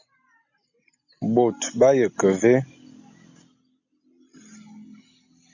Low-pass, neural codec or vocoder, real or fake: 7.2 kHz; none; real